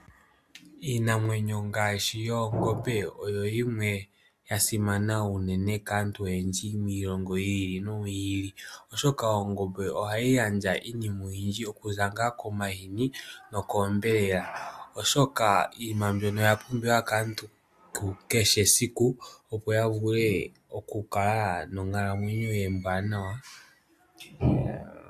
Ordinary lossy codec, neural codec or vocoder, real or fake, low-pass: AAC, 96 kbps; none; real; 14.4 kHz